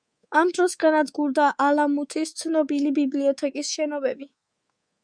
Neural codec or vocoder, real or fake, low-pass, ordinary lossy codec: codec, 24 kHz, 3.1 kbps, DualCodec; fake; 9.9 kHz; Opus, 64 kbps